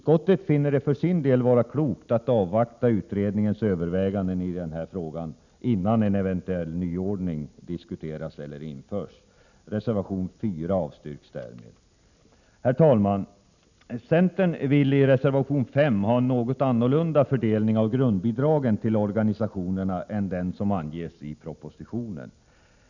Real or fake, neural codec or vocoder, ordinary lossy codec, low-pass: real; none; none; 7.2 kHz